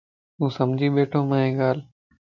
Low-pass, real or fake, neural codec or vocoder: 7.2 kHz; real; none